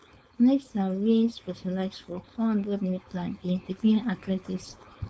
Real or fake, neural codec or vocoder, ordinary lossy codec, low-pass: fake; codec, 16 kHz, 4.8 kbps, FACodec; none; none